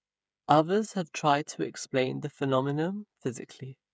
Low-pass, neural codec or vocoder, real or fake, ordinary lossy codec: none; codec, 16 kHz, 8 kbps, FreqCodec, smaller model; fake; none